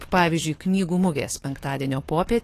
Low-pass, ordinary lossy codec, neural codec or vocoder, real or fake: 14.4 kHz; AAC, 48 kbps; codec, 44.1 kHz, 7.8 kbps, DAC; fake